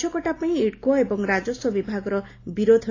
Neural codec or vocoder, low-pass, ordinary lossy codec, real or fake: none; 7.2 kHz; AAC, 32 kbps; real